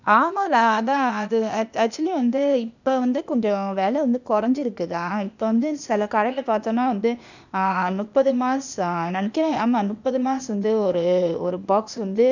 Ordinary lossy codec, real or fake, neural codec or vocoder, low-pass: none; fake; codec, 16 kHz, 0.8 kbps, ZipCodec; 7.2 kHz